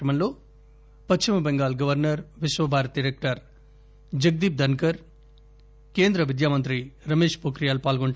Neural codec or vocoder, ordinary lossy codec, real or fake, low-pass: none; none; real; none